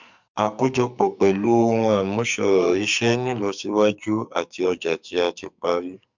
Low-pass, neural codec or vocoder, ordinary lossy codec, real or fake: 7.2 kHz; codec, 44.1 kHz, 2.6 kbps, SNAC; MP3, 64 kbps; fake